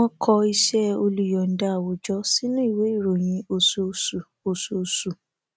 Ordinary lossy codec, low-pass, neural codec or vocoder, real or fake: none; none; none; real